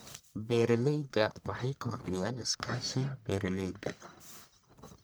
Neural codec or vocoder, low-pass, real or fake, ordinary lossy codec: codec, 44.1 kHz, 1.7 kbps, Pupu-Codec; none; fake; none